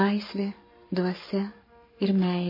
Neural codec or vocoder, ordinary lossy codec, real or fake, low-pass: codec, 44.1 kHz, 7.8 kbps, Pupu-Codec; MP3, 24 kbps; fake; 5.4 kHz